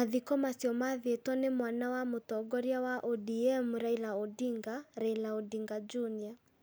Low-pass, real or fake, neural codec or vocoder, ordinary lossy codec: none; real; none; none